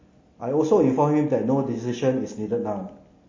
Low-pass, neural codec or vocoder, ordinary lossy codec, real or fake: 7.2 kHz; none; MP3, 32 kbps; real